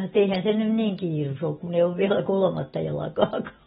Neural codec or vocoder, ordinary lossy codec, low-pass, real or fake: none; AAC, 16 kbps; 10.8 kHz; real